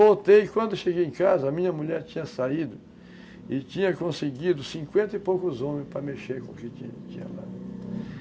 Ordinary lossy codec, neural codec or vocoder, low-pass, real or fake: none; none; none; real